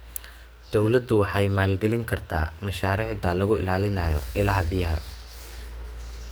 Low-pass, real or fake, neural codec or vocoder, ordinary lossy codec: none; fake; codec, 44.1 kHz, 2.6 kbps, SNAC; none